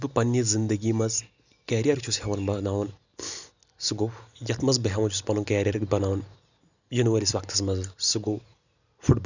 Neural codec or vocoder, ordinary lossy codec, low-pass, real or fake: none; none; 7.2 kHz; real